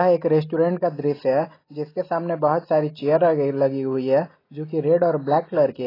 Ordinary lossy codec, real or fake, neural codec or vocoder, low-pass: AAC, 24 kbps; real; none; 5.4 kHz